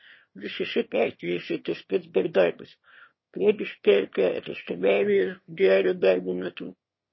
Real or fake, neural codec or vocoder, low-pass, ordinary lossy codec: fake; autoencoder, 22.05 kHz, a latent of 192 numbers a frame, VITS, trained on one speaker; 7.2 kHz; MP3, 24 kbps